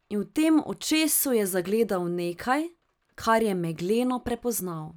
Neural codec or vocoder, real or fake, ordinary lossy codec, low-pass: none; real; none; none